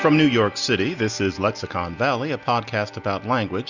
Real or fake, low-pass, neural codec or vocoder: real; 7.2 kHz; none